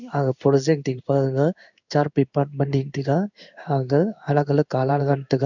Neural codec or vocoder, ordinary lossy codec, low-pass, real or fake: codec, 16 kHz in and 24 kHz out, 1 kbps, XY-Tokenizer; none; 7.2 kHz; fake